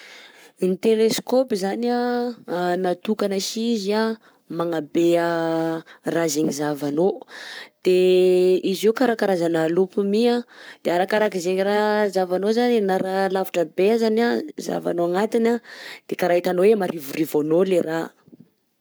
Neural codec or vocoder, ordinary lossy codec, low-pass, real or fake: codec, 44.1 kHz, 7.8 kbps, Pupu-Codec; none; none; fake